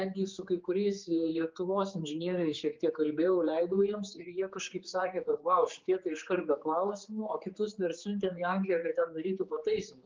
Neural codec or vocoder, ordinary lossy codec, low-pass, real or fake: codec, 16 kHz, 4 kbps, X-Codec, HuBERT features, trained on general audio; Opus, 32 kbps; 7.2 kHz; fake